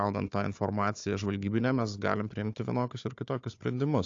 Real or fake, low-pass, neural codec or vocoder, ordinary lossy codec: fake; 7.2 kHz; codec, 16 kHz, 4 kbps, FunCodec, trained on Chinese and English, 50 frames a second; AAC, 48 kbps